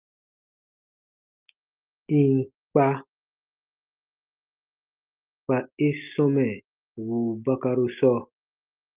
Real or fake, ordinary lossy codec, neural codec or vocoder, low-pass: real; Opus, 32 kbps; none; 3.6 kHz